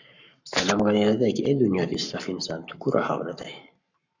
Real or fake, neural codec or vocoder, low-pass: fake; codec, 44.1 kHz, 7.8 kbps, Pupu-Codec; 7.2 kHz